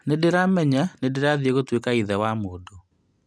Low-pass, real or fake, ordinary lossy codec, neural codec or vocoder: none; real; none; none